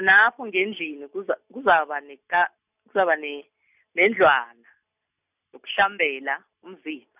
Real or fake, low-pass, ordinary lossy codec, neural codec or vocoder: real; 3.6 kHz; none; none